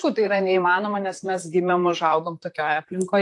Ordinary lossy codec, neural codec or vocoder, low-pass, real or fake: AAC, 48 kbps; vocoder, 44.1 kHz, 128 mel bands, Pupu-Vocoder; 10.8 kHz; fake